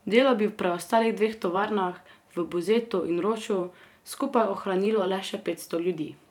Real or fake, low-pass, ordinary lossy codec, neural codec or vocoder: fake; 19.8 kHz; none; vocoder, 44.1 kHz, 128 mel bands every 512 samples, BigVGAN v2